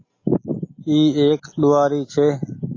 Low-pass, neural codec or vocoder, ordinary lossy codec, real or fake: 7.2 kHz; none; AAC, 32 kbps; real